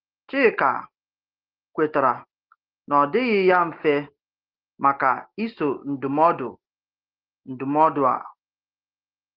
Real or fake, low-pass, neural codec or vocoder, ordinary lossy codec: real; 5.4 kHz; none; Opus, 16 kbps